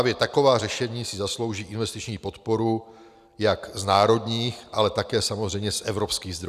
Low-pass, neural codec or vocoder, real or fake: 14.4 kHz; none; real